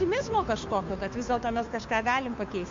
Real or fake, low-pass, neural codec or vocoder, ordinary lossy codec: fake; 7.2 kHz; codec, 16 kHz, 2 kbps, FunCodec, trained on Chinese and English, 25 frames a second; MP3, 48 kbps